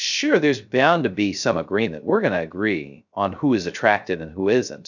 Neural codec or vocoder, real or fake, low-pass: codec, 16 kHz, 0.3 kbps, FocalCodec; fake; 7.2 kHz